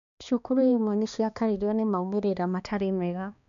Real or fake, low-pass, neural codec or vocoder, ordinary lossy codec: fake; 7.2 kHz; codec, 16 kHz, 2 kbps, X-Codec, HuBERT features, trained on balanced general audio; none